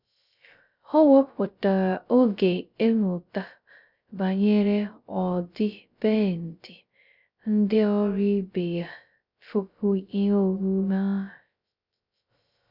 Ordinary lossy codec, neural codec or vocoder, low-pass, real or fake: none; codec, 16 kHz, 0.2 kbps, FocalCodec; 5.4 kHz; fake